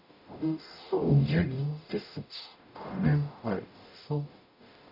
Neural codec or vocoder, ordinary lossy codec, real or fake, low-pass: codec, 44.1 kHz, 0.9 kbps, DAC; none; fake; 5.4 kHz